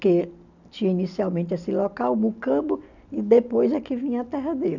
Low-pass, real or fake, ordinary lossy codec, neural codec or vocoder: 7.2 kHz; real; none; none